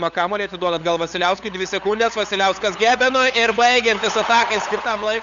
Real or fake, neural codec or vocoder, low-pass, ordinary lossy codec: fake; codec, 16 kHz, 8 kbps, FunCodec, trained on LibriTTS, 25 frames a second; 7.2 kHz; Opus, 64 kbps